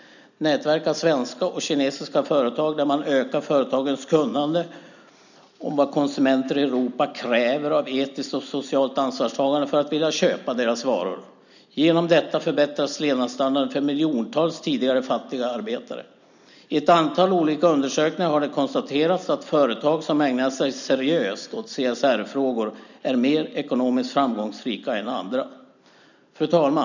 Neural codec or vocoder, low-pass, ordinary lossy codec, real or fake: none; 7.2 kHz; none; real